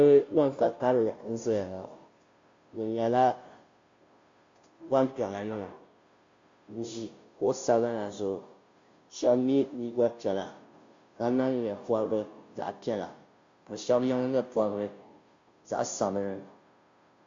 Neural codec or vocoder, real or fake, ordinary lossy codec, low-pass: codec, 16 kHz, 0.5 kbps, FunCodec, trained on Chinese and English, 25 frames a second; fake; MP3, 48 kbps; 7.2 kHz